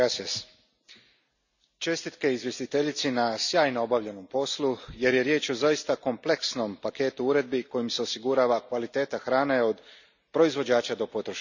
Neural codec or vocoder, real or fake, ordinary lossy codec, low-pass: none; real; none; 7.2 kHz